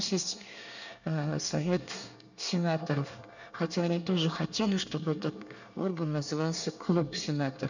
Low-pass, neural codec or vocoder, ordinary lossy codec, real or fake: 7.2 kHz; codec, 24 kHz, 1 kbps, SNAC; none; fake